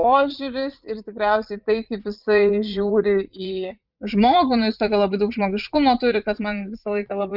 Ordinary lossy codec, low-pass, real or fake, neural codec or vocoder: Opus, 64 kbps; 5.4 kHz; fake; vocoder, 44.1 kHz, 80 mel bands, Vocos